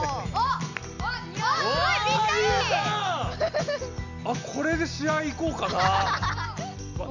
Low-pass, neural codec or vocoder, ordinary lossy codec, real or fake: 7.2 kHz; none; none; real